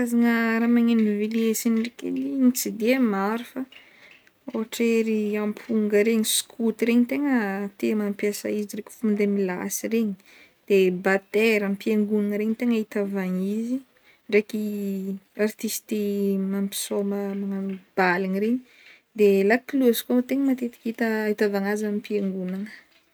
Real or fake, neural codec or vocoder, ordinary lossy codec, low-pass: real; none; none; none